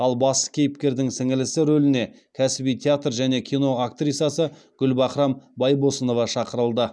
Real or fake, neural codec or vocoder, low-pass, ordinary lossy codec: real; none; none; none